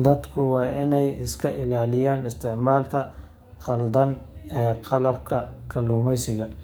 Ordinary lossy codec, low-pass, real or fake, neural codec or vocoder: none; none; fake; codec, 44.1 kHz, 2.6 kbps, SNAC